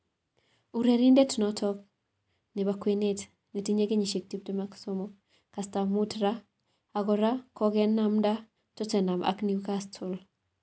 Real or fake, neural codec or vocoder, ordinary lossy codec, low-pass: real; none; none; none